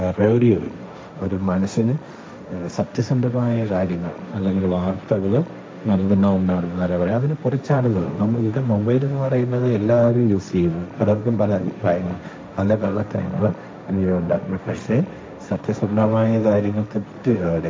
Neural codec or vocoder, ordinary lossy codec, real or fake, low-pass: codec, 16 kHz, 1.1 kbps, Voila-Tokenizer; none; fake; none